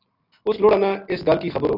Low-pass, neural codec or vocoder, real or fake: 5.4 kHz; none; real